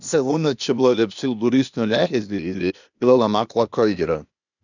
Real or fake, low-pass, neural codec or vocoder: fake; 7.2 kHz; codec, 16 kHz, 0.8 kbps, ZipCodec